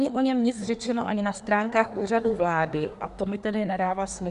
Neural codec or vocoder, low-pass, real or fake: codec, 24 kHz, 1 kbps, SNAC; 10.8 kHz; fake